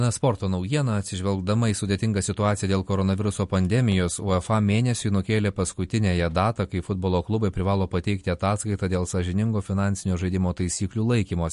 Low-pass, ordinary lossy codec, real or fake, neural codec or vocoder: 10.8 kHz; MP3, 48 kbps; real; none